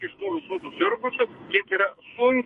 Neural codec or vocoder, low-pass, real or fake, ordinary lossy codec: codec, 44.1 kHz, 2.6 kbps, SNAC; 14.4 kHz; fake; MP3, 48 kbps